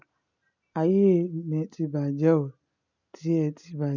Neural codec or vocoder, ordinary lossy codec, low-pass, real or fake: none; none; 7.2 kHz; real